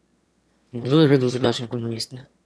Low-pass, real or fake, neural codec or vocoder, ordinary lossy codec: none; fake; autoencoder, 22.05 kHz, a latent of 192 numbers a frame, VITS, trained on one speaker; none